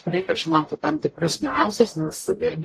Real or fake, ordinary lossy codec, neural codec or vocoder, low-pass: fake; AAC, 48 kbps; codec, 44.1 kHz, 0.9 kbps, DAC; 14.4 kHz